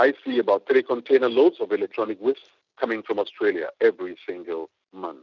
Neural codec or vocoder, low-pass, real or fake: none; 7.2 kHz; real